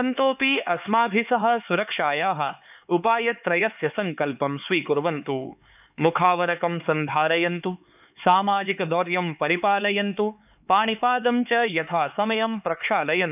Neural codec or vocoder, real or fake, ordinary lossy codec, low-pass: codec, 16 kHz, 4 kbps, X-Codec, HuBERT features, trained on LibriSpeech; fake; none; 3.6 kHz